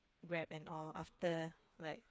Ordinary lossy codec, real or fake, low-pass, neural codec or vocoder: none; fake; none; codec, 16 kHz, 4 kbps, FreqCodec, smaller model